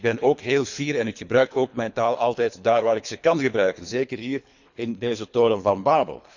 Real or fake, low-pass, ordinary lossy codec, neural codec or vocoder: fake; 7.2 kHz; none; codec, 24 kHz, 3 kbps, HILCodec